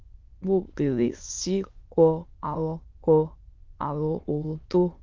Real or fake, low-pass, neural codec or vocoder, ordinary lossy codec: fake; 7.2 kHz; autoencoder, 22.05 kHz, a latent of 192 numbers a frame, VITS, trained on many speakers; Opus, 32 kbps